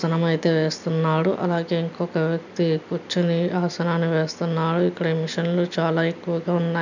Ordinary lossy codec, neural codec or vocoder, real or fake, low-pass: none; none; real; 7.2 kHz